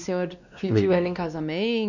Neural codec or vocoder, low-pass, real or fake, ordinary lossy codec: codec, 16 kHz, 2 kbps, X-Codec, WavLM features, trained on Multilingual LibriSpeech; 7.2 kHz; fake; none